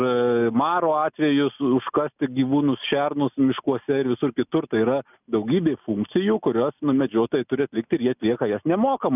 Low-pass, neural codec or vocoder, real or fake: 3.6 kHz; none; real